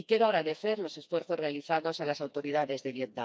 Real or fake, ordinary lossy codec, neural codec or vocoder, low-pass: fake; none; codec, 16 kHz, 2 kbps, FreqCodec, smaller model; none